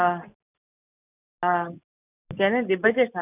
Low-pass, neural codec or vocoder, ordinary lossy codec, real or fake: 3.6 kHz; none; none; real